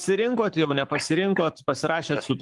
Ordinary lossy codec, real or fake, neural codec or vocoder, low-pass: Opus, 24 kbps; fake; codec, 44.1 kHz, 7.8 kbps, Pupu-Codec; 10.8 kHz